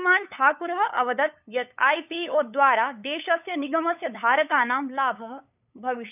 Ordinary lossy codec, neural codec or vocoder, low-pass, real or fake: none; codec, 16 kHz, 8 kbps, FunCodec, trained on LibriTTS, 25 frames a second; 3.6 kHz; fake